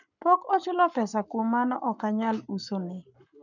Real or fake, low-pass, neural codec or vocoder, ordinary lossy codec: fake; 7.2 kHz; codec, 44.1 kHz, 7.8 kbps, Pupu-Codec; none